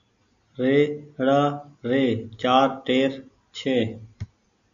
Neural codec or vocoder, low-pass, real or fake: none; 7.2 kHz; real